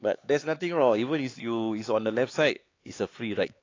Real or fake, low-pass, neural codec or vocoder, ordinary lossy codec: fake; 7.2 kHz; codec, 16 kHz, 4 kbps, X-Codec, HuBERT features, trained on LibriSpeech; AAC, 32 kbps